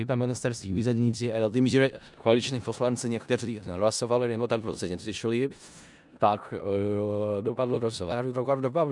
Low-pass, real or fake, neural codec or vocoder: 10.8 kHz; fake; codec, 16 kHz in and 24 kHz out, 0.4 kbps, LongCat-Audio-Codec, four codebook decoder